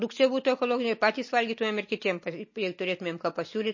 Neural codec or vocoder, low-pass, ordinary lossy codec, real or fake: none; 7.2 kHz; MP3, 32 kbps; real